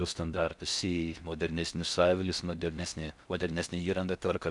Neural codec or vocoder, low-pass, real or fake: codec, 16 kHz in and 24 kHz out, 0.6 kbps, FocalCodec, streaming, 4096 codes; 10.8 kHz; fake